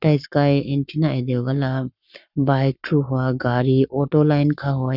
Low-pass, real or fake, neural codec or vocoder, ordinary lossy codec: 5.4 kHz; fake; codec, 44.1 kHz, 7.8 kbps, Pupu-Codec; none